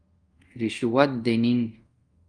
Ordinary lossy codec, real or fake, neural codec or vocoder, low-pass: Opus, 32 kbps; fake; codec, 24 kHz, 0.5 kbps, DualCodec; 9.9 kHz